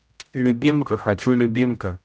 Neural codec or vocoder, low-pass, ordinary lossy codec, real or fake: codec, 16 kHz, 0.5 kbps, X-Codec, HuBERT features, trained on general audio; none; none; fake